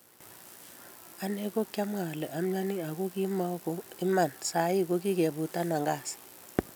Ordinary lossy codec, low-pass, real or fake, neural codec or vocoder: none; none; real; none